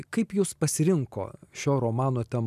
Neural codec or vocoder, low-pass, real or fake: none; 14.4 kHz; real